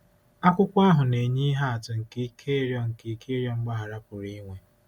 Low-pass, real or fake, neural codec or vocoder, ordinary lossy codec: 19.8 kHz; real; none; none